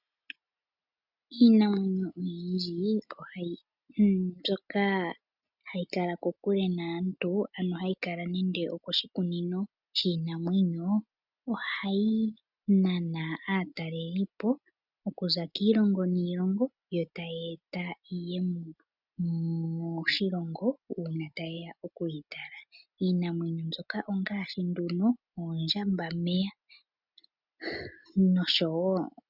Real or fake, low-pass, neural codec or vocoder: real; 5.4 kHz; none